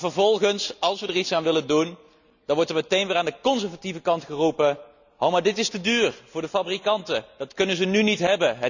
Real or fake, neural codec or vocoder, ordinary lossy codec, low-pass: real; none; none; 7.2 kHz